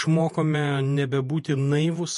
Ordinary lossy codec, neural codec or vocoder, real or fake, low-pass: MP3, 48 kbps; vocoder, 48 kHz, 128 mel bands, Vocos; fake; 14.4 kHz